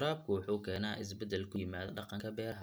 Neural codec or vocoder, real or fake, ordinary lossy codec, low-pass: none; real; none; none